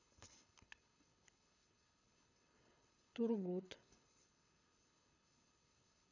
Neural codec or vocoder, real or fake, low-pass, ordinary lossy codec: codec, 24 kHz, 6 kbps, HILCodec; fake; 7.2 kHz; none